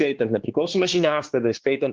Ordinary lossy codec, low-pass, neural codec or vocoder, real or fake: Opus, 16 kbps; 7.2 kHz; codec, 16 kHz, 2 kbps, X-Codec, WavLM features, trained on Multilingual LibriSpeech; fake